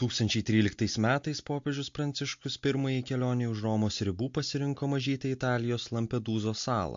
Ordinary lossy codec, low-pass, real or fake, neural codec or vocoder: MP3, 48 kbps; 7.2 kHz; real; none